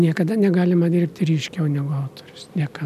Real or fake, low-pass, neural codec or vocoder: real; 14.4 kHz; none